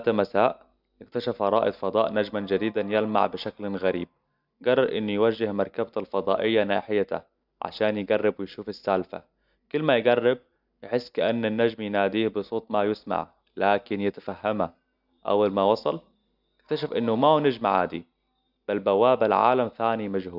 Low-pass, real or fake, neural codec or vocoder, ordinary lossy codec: 5.4 kHz; real; none; none